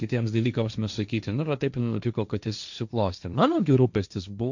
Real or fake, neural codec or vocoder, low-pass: fake; codec, 16 kHz, 1.1 kbps, Voila-Tokenizer; 7.2 kHz